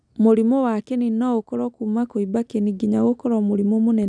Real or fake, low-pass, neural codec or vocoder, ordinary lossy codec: real; 9.9 kHz; none; none